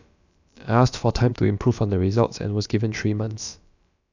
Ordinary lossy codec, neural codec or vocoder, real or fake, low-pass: none; codec, 16 kHz, about 1 kbps, DyCAST, with the encoder's durations; fake; 7.2 kHz